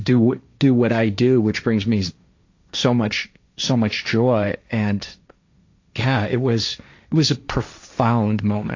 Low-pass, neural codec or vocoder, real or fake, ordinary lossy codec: 7.2 kHz; codec, 16 kHz, 1.1 kbps, Voila-Tokenizer; fake; AAC, 48 kbps